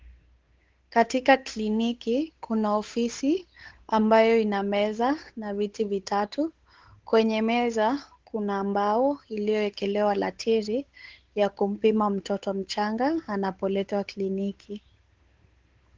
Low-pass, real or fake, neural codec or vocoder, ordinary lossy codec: 7.2 kHz; fake; codec, 16 kHz, 8 kbps, FunCodec, trained on Chinese and English, 25 frames a second; Opus, 16 kbps